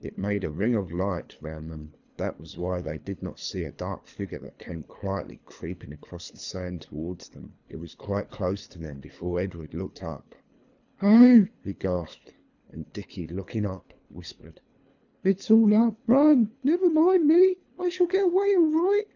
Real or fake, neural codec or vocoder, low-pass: fake; codec, 24 kHz, 3 kbps, HILCodec; 7.2 kHz